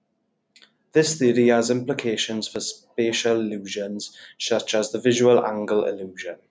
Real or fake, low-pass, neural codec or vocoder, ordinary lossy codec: real; none; none; none